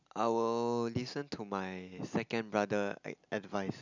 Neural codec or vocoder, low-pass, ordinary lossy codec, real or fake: none; 7.2 kHz; none; real